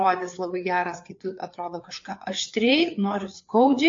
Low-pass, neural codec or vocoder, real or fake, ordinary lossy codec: 7.2 kHz; codec, 16 kHz, 4 kbps, FreqCodec, larger model; fake; AAC, 48 kbps